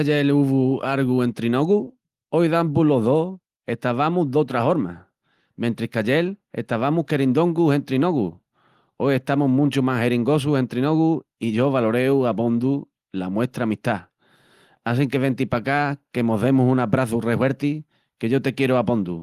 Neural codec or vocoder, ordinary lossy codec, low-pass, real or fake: none; Opus, 24 kbps; 14.4 kHz; real